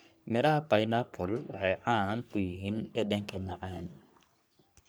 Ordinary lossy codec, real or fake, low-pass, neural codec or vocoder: none; fake; none; codec, 44.1 kHz, 3.4 kbps, Pupu-Codec